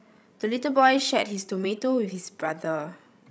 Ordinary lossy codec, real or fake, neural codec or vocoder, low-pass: none; fake; codec, 16 kHz, 16 kbps, FreqCodec, larger model; none